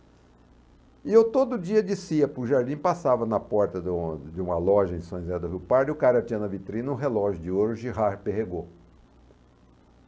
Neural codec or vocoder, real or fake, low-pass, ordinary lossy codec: none; real; none; none